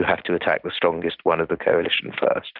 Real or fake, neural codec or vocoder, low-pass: real; none; 5.4 kHz